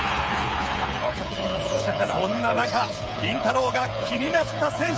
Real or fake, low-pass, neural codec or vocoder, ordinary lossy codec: fake; none; codec, 16 kHz, 8 kbps, FreqCodec, smaller model; none